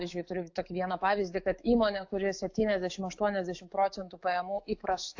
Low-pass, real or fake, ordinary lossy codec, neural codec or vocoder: 7.2 kHz; fake; AAC, 48 kbps; autoencoder, 48 kHz, 128 numbers a frame, DAC-VAE, trained on Japanese speech